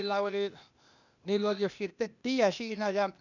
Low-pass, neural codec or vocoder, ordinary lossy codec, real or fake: 7.2 kHz; codec, 16 kHz, 0.8 kbps, ZipCodec; none; fake